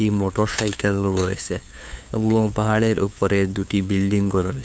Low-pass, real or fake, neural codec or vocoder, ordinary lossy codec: none; fake; codec, 16 kHz, 2 kbps, FunCodec, trained on LibriTTS, 25 frames a second; none